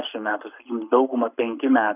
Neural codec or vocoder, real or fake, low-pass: codec, 44.1 kHz, 7.8 kbps, Pupu-Codec; fake; 3.6 kHz